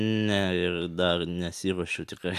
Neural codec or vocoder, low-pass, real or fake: none; 14.4 kHz; real